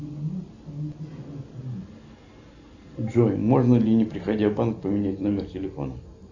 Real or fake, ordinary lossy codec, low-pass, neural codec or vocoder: real; AAC, 48 kbps; 7.2 kHz; none